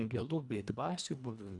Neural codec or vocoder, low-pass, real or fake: codec, 24 kHz, 1.5 kbps, HILCodec; 10.8 kHz; fake